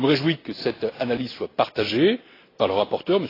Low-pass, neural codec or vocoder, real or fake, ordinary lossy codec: 5.4 kHz; none; real; AAC, 24 kbps